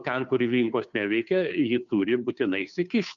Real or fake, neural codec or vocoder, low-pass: fake; codec, 16 kHz, 2 kbps, FunCodec, trained on Chinese and English, 25 frames a second; 7.2 kHz